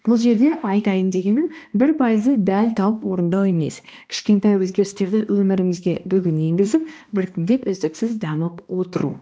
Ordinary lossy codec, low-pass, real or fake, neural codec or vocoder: none; none; fake; codec, 16 kHz, 1 kbps, X-Codec, HuBERT features, trained on balanced general audio